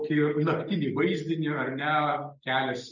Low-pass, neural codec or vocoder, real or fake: 7.2 kHz; none; real